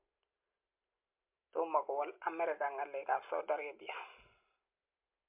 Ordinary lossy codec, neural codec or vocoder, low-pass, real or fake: MP3, 32 kbps; none; 3.6 kHz; real